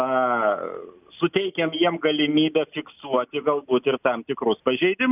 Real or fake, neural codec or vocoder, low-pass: real; none; 3.6 kHz